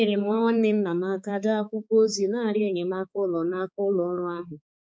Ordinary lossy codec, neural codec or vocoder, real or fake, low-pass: none; codec, 16 kHz, 4 kbps, X-Codec, HuBERT features, trained on balanced general audio; fake; none